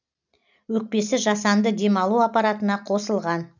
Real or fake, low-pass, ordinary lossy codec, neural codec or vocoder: real; 7.2 kHz; none; none